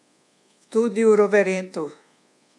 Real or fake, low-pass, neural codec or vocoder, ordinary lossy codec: fake; none; codec, 24 kHz, 1.2 kbps, DualCodec; none